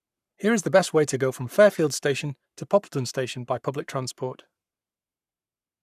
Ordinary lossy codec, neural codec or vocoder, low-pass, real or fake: none; codec, 44.1 kHz, 7.8 kbps, Pupu-Codec; 14.4 kHz; fake